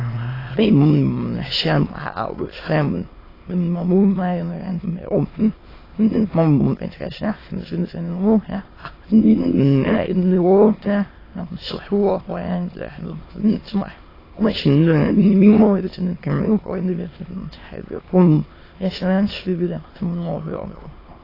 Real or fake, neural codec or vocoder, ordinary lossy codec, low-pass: fake; autoencoder, 22.05 kHz, a latent of 192 numbers a frame, VITS, trained on many speakers; AAC, 24 kbps; 5.4 kHz